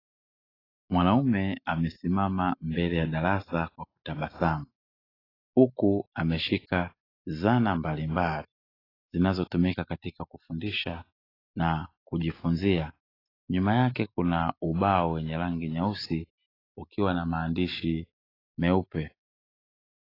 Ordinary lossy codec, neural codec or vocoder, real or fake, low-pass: AAC, 24 kbps; none; real; 5.4 kHz